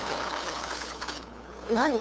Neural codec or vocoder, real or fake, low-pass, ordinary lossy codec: codec, 16 kHz, 2 kbps, FunCodec, trained on LibriTTS, 25 frames a second; fake; none; none